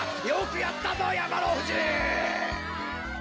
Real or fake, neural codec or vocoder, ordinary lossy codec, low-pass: real; none; none; none